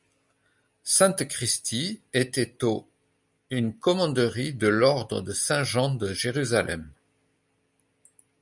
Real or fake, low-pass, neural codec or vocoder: real; 10.8 kHz; none